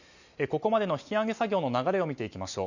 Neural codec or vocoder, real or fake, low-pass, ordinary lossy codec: none; real; 7.2 kHz; none